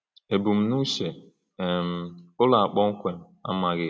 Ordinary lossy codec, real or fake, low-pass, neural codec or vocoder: none; real; none; none